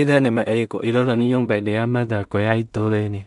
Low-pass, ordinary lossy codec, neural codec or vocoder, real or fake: 10.8 kHz; none; codec, 16 kHz in and 24 kHz out, 0.4 kbps, LongCat-Audio-Codec, two codebook decoder; fake